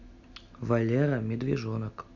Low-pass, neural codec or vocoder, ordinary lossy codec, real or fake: 7.2 kHz; none; none; real